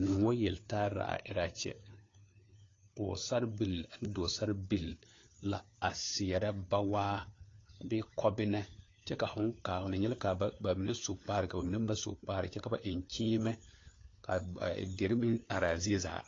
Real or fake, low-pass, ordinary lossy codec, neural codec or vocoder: fake; 7.2 kHz; AAC, 32 kbps; codec, 16 kHz, 4 kbps, FunCodec, trained on LibriTTS, 50 frames a second